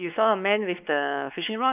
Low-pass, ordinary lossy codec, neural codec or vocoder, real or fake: 3.6 kHz; none; codec, 16 kHz, 4 kbps, X-Codec, WavLM features, trained on Multilingual LibriSpeech; fake